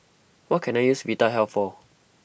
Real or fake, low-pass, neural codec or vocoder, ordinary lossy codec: real; none; none; none